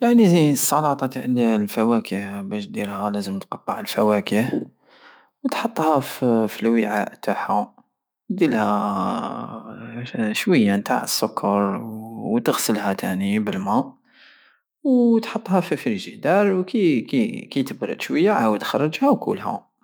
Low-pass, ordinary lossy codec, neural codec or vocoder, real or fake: none; none; autoencoder, 48 kHz, 128 numbers a frame, DAC-VAE, trained on Japanese speech; fake